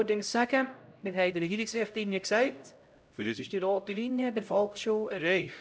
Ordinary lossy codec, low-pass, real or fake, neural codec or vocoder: none; none; fake; codec, 16 kHz, 0.5 kbps, X-Codec, HuBERT features, trained on LibriSpeech